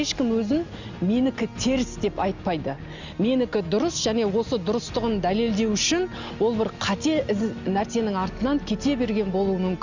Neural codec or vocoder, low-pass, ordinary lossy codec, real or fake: none; 7.2 kHz; Opus, 64 kbps; real